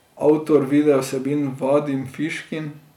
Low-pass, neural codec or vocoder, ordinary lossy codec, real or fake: 19.8 kHz; none; none; real